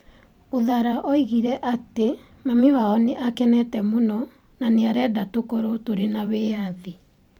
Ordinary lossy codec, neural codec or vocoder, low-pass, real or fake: MP3, 96 kbps; vocoder, 44.1 kHz, 128 mel bands every 512 samples, BigVGAN v2; 19.8 kHz; fake